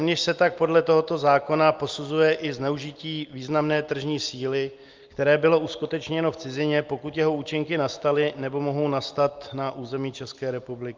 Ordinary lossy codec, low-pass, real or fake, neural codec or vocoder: Opus, 32 kbps; 7.2 kHz; real; none